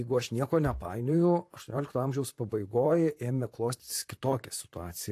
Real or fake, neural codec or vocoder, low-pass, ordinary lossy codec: fake; vocoder, 44.1 kHz, 128 mel bands, Pupu-Vocoder; 14.4 kHz; AAC, 64 kbps